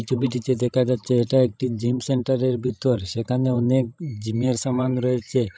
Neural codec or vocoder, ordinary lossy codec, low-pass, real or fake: codec, 16 kHz, 16 kbps, FreqCodec, larger model; none; none; fake